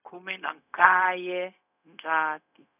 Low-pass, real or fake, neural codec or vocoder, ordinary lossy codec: 3.6 kHz; fake; codec, 16 kHz, 0.4 kbps, LongCat-Audio-Codec; none